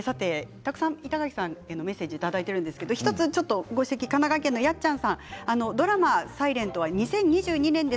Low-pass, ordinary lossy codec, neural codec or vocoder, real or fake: none; none; none; real